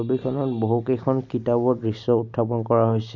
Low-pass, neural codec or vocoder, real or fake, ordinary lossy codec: 7.2 kHz; none; real; none